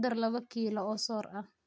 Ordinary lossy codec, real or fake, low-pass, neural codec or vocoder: none; real; none; none